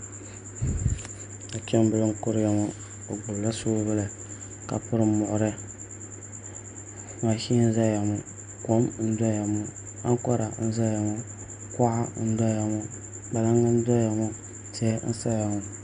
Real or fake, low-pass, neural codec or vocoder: real; 9.9 kHz; none